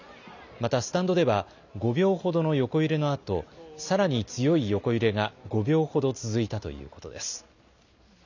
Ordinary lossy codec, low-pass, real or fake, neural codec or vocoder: none; 7.2 kHz; real; none